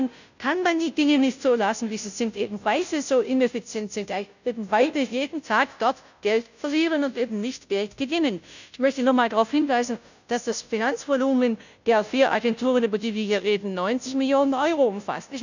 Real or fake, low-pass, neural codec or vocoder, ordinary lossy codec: fake; 7.2 kHz; codec, 16 kHz, 0.5 kbps, FunCodec, trained on Chinese and English, 25 frames a second; none